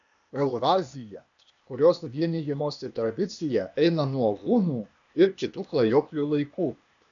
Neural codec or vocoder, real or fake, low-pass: codec, 16 kHz, 0.8 kbps, ZipCodec; fake; 7.2 kHz